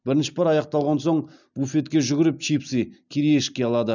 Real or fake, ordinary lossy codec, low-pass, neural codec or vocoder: real; none; 7.2 kHz; none